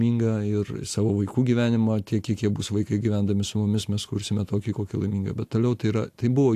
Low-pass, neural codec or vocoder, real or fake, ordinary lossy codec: 14.4 kHz; none; real; AAC, 64 kbps